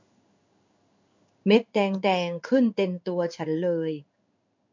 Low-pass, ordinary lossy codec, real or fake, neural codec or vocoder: 7.2 kHz; MP3, 64 kbps; fake; codec, 16 kHz in and 24 kHz out, 1 kbps, XY-Tokenizer